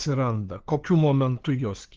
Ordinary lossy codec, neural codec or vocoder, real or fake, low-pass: Opus, 16 kbps; codec, 16 kHz, 2 kbps, FunCodec, trained on LibriTTS, 25 frames a second; fake; 7.2 kHz